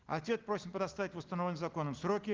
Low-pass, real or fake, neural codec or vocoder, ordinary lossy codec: 7.2 kHz; real; none; Opus, 16 kbps